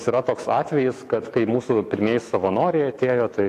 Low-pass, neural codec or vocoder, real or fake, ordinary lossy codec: 14.4 kHz; autoencoder, 48 kHz, 128 numbers a frame, DAC-VAE, trained on Japanese speech; fake; AAC, 64 kbps